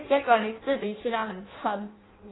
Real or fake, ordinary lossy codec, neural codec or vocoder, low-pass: fake; AAC, 16 kbps; codec, 16 kHz in and 24 kHz out, 0.6 kbps, FireRedTTS-2 codec; 7.2 kHz